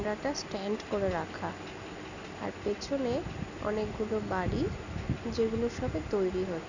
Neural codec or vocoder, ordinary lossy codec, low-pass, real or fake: none; none; 7.2 kHz; real